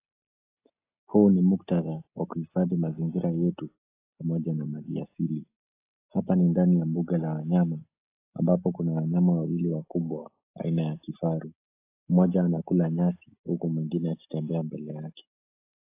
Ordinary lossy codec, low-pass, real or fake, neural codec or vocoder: AAC, 24 kbps; 3.6 kHz; real; none